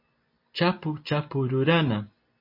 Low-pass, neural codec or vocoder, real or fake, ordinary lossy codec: 5.4 kHz; none; real; MP3, 32 kbps